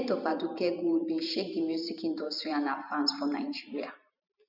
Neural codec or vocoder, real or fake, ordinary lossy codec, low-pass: vocoder, 44.1 kHz, 128 mel bands every 512 samples, BigVGAN v2; fake; none; 5.4 kHz